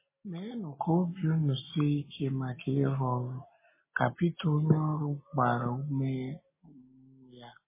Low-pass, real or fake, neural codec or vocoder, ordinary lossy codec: 3.6 kHz; real; none; MP3, 16 kbps